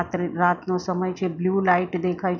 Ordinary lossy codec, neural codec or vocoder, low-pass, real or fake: none; none; 7.2 kHz; real